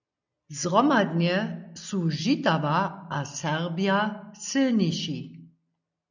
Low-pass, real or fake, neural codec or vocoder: 7.2 kHz; real; none